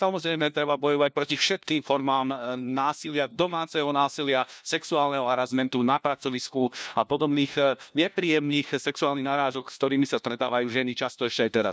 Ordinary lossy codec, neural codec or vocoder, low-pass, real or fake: none; codec, 16 kHz, 1 kbps, FunCodec, trained on LibriTTS, 50 frames a second; none; fake